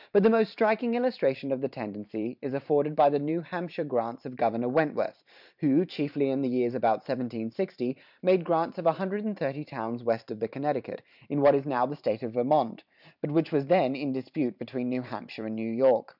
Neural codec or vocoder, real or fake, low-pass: vocoder, 44.1 kHz, 128 mel bands every 512 samples, BigVGAN v2; fake; 5.4 kHz